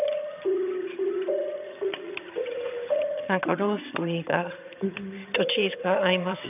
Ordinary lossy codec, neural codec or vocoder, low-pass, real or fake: none; vocoder, 22.05 kHz, 80 mel bands, HiFi-GAN; 3.6 kHz; fake